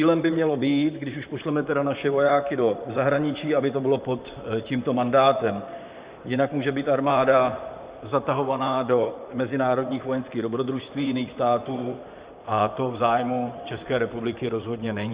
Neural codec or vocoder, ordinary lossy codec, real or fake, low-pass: vocoder, 44.1 kHz, 128 mel bands, Pupu-Vocoder; Opus, 64 kbps; fake; 3.6 kHz